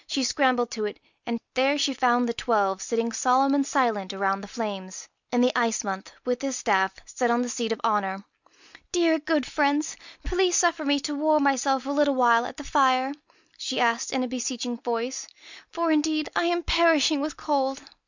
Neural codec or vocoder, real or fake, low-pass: none; real; 7.2 kHz